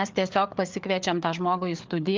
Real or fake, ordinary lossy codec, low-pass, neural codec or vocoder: fake; Opus, 32 kbps; 7.2 kHz; codec, 16 kHz, 4 kbps, FreqCodec, larger model